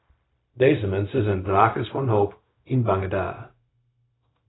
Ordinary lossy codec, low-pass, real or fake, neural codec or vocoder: AAC, 16 kbps; 7.2 kHz; fake; codec, 16 kHz, 0.4 kbps, LongCat-Audio-Codec